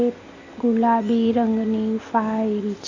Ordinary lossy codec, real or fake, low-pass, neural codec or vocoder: AAC, 48 kbps; real; 7.2 kHz; none